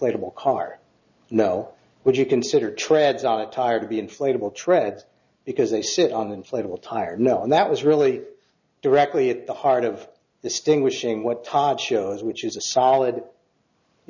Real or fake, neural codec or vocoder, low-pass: real; none; 7.2 kHz